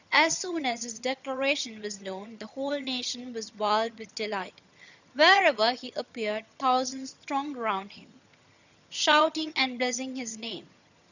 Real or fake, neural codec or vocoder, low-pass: fake; vocoder, 22.05 kHz, 80 mel bands, HiFi-GAN; 7.2 kHz